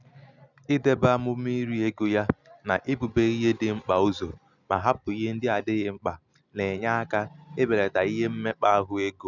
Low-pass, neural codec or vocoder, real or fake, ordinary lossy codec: 7.2 kHz; none; real; none